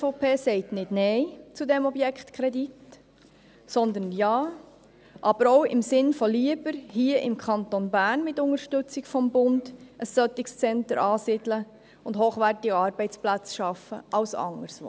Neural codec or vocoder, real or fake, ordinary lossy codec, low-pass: none; real; none; none